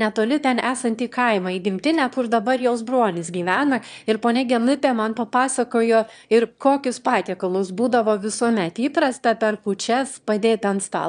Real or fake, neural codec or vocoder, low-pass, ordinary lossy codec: fake; autoencoder, 22.05 kHz, a latent of 192 numbers a frame, VITS, trained on one speaker; 9.9 kHz; MP3, 96 kbps